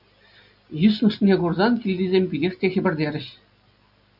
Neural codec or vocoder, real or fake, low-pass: none; real; 5.4 kHz